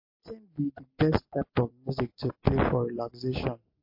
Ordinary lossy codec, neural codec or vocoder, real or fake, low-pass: MP3, 32 kbps; none; real; 5.4 kHz